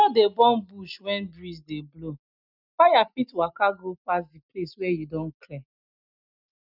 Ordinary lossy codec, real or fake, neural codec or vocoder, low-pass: none; real; none; 5.4 kHz